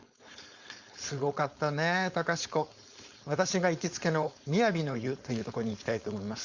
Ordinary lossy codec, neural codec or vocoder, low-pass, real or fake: Opus, 64 kbps; codec, 16 kHz, 4.8 kbps, FACodec; 7.2 kHz; fake